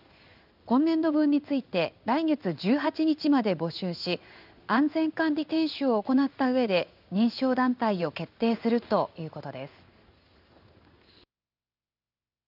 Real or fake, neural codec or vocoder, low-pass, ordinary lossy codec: fake; codec, 16 kHz in and 24 kHz out, 1 kbps, XY-Tokenizer; 5.4 kHz; none